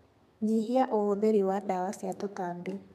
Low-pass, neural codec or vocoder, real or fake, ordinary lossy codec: 14.4 kHz; codec, 32 kHz, 1.9 kbps, SNAC; fake; none